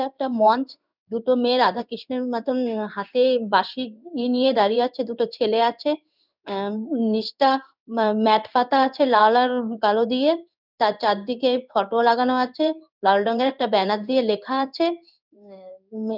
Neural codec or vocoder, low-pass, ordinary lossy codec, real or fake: codec, 16 kHz in and 24 kHz out, 1 kbps, XY-Tokenizer; 5.4 kHz; none; fake